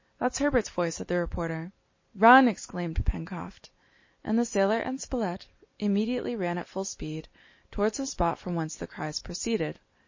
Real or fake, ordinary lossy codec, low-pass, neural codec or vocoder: real; MP3, 32 kbps; 7.2 kHz; none